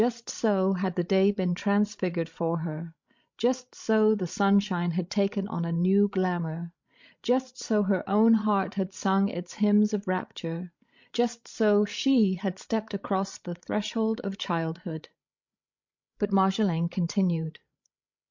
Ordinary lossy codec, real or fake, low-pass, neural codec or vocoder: MP3, 48 kbps; fake; 7.2 kHz; codec, 16 kHz, 16 kbps, FreqCodec, larger model